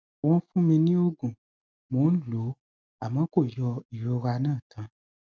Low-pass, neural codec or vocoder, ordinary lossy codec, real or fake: none; none; none; real